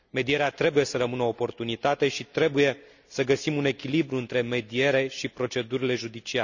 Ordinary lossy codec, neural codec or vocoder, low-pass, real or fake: none; none; 7.2 kHz; real